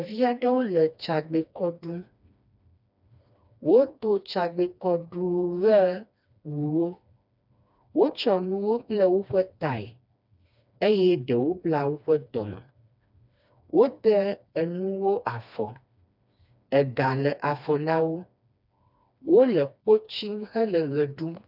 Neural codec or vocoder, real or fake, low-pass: codec, 16 kHz, 2 kbps, FreqCodec, smaller model; fake; 5.4 kHz